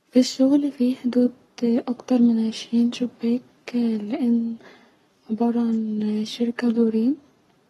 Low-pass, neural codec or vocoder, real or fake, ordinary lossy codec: 19.8 kHz; codec, 44.1 kHz, 7.8 kbps, Pupu-Codec; fake; AAC, 32 kbps